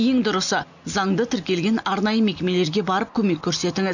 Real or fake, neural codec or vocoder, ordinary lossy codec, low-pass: real; none; none; 7.2 kHz